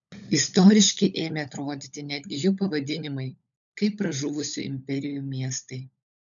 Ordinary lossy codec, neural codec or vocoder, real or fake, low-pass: MP3, 96 kbps; codec, 16 kHz, 16 kbps, FunCodec, trained on LibriTTS, 50 frames a second; fake; 7.2 kHz